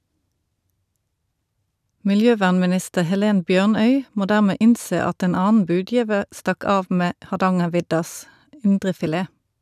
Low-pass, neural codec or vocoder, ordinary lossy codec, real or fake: 14.4 kHz; none; none; real